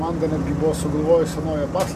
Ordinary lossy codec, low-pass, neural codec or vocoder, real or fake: MP3, 64 kbps; 14.4 kHz; none; real